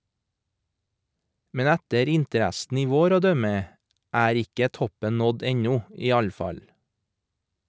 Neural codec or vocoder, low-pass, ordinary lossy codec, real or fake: none; none; none; real